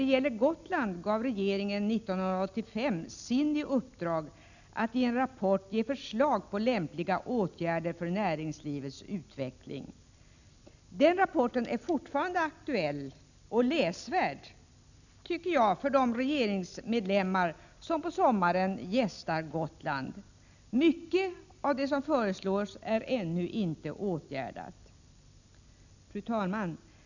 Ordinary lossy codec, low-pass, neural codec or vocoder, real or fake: none; 7.2 kHz; none; real